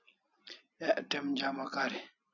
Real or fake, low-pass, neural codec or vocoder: real; 7.2 kHz; none